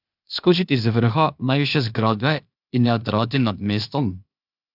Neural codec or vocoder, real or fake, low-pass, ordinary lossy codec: codec, 16 kHz, 0.8 kbps, ZipCodec; fake; 5.4 kHz; none